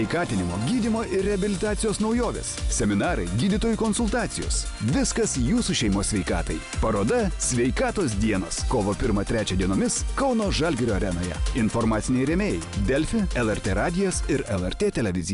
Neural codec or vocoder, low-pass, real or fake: none; 10.8 kHz; real